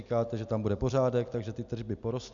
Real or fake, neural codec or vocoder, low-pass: real; none; 7.2 kHz